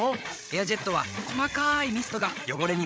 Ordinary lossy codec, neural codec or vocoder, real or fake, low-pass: none; codec, 16 kHz, 8 kbps, FreqCodec, larger model; fake; none